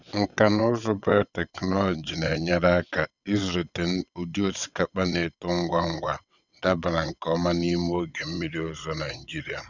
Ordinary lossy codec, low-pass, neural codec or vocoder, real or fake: none; 7.2 kHz; vocoder, 22.05 kHz, 80 mel bands, WaveNeXt; fake